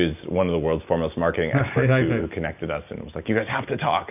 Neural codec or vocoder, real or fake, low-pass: none; real; 3.6 kHz